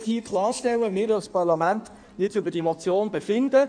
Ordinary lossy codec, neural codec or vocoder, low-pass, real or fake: none; codec, 16 kHz in and 24 kHz out, 1.1 kbps, FireRedTTS-2 codec; 9.9 kHz; fake